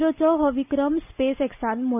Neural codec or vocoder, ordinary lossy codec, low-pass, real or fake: none; none; 3.6 kHz; real